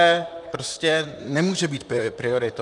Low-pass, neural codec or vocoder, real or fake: 10.8 kHz; vocoder, 44.1 kHz, 128 mel bands, Pupu-Vocoder; fake